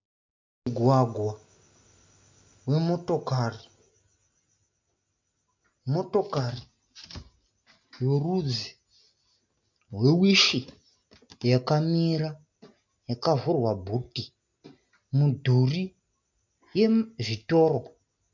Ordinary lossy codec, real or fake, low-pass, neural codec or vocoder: MP3, 64 kbps; real; 7.2 kHz; none